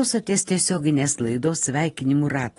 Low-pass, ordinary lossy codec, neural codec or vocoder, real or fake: 10.8 kHz; AAC, 32 kbps; vocoder, 24 kHz, 100 mel bands, Vocos; fake